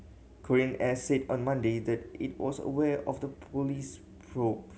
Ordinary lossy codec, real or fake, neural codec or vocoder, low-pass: none; real; none; none